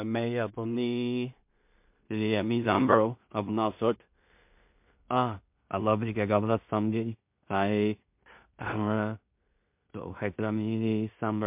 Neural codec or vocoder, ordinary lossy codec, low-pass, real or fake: codec, 16 kHz in and 24 kHz out, 0.4 kbps, LongCat-Audio-Codec, two codebook decoder; MP3, 32 kbps; 3.6 kHz; fake